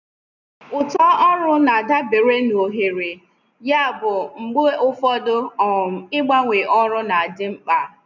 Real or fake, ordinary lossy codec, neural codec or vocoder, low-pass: real; none; none; 7.2 kHz